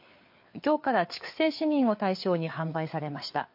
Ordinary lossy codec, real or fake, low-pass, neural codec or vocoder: MP3, 32 kbps; fake; 5.4 kHz; codec, 16 kHz, 4 kbps, FreqCodec, larger model